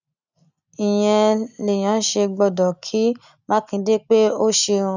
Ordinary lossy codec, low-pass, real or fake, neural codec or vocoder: none; 7.2 kHz; real; none